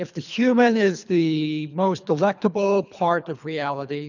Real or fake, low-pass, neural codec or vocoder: fake; 7.2 kHz; codec, 24 kHz, 3 kbps, HILCodec